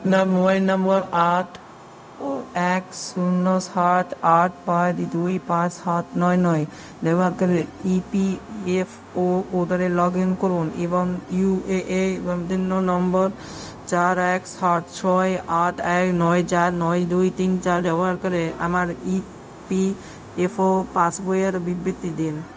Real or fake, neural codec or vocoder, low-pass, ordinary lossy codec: fake; codec, 16 kHz, 0.4 kbps, LongCat-Audio-Codec; none; none